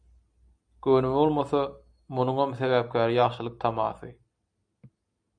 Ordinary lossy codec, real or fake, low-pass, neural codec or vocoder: MP3, 96 kbps; real; 9.9 kHz; none